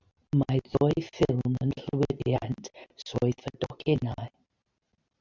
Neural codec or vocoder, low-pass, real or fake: none; 7.2 kHz; real